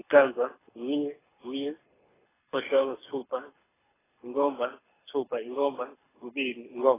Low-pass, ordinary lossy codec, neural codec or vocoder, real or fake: 3.6 kHz; AAC, 16 kbps; codec, 24 kHz, 6 kbps, HILCodec; fake